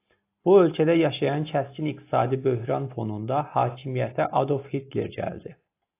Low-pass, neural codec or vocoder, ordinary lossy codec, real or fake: 3.6 kHz; none; AAC, 24 kbps; real